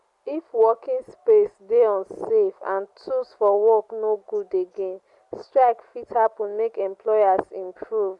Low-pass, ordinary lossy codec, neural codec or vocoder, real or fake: 10.8 kHz; Opus, 64 kbps; none; real